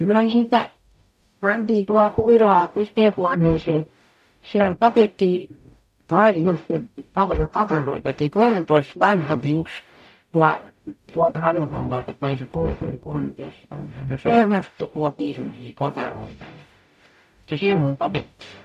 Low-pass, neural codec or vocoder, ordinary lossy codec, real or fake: 14.4 kHz; codec, 44.1 kHz, 0.9 kbps, DAC; none; fake